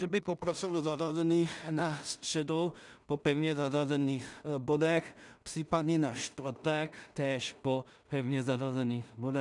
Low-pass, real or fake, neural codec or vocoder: 10.8 kHz; fake; codec, 16 kHz in and 24 kHz out, 0.4 kbps, LongCat-Audio-Codec, two codebook decoder